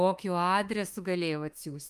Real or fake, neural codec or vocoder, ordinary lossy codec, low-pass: fake; autoencoder, 48 kHz, 32 numbers a frame, DAC-VAE, trained on Japanese speech; Opus, 32 kbps; 14.4 kHz